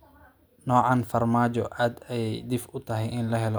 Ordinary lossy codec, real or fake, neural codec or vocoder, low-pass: none; real; none; none